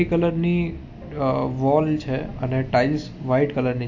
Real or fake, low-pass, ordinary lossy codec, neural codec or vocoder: real; 7.2 kHz; AAC, 48 kbps; none